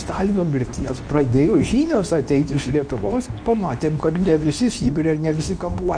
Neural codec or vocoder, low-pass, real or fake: codec, 24 kHz, 0.9 kbps, WavTokenizer, medium speech release version 2; 9.9 kHz; fake